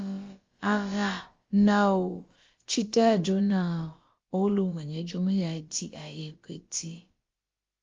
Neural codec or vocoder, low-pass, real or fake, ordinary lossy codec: codec, 16 kHz, about 1 kbps, DyCAST, with the encoder's durations; 7.2 kHz; fake; Opus, 32 kbps